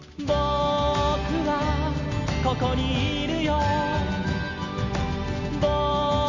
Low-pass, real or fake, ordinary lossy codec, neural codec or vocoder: 7.2 kHz; real; none; none